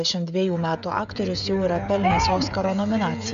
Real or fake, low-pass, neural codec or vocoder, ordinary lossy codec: fake; 7.2 kHz; codec, 16 kHz, 16 kbps, FreqCodec, smaller model; MP3, 96 kbps